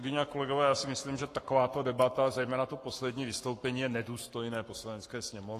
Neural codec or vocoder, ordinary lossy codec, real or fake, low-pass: codec, 44.1 kHz, 7.8 kbps, Pupu-Codec; AAC, 48 kbps; fake; 14.4 kHz